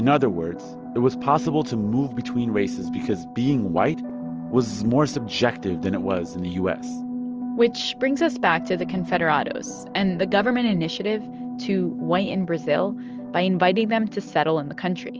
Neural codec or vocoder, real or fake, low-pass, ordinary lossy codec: none; real; 7.2 kHz; Opus, 24 kbps